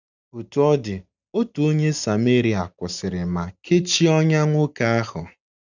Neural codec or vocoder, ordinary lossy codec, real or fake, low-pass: none; none; real; 7.2 kHz